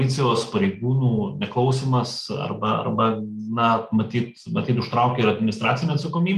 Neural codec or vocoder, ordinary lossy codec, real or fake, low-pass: none; Opus, 32 kbps; real; 14.4 kHz